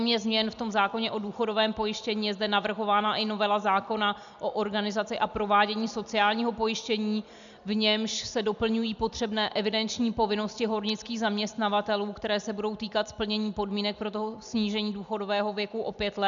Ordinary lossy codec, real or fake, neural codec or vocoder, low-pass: MP3, 96 kbps; real; none; 7.2 kHz